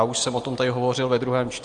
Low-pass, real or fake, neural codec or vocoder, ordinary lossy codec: 9.9 kHz; real; none; Opus, 32 kbps